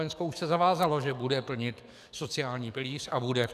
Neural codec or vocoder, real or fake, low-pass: codec, 44.1 kHz, 7.8 kbps, DAC; fake; 14.4 kHz